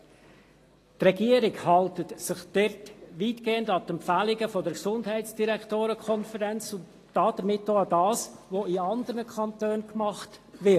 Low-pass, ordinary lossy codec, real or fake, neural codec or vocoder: 14.4 kHz; AAC, 48 kbps; real; none